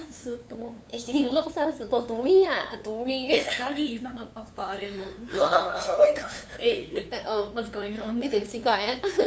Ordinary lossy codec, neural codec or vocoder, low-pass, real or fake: none; codec, 16 kHz, 2 kbps, FunCodec, trained on LibriTTS, 25 frames a second; none; fake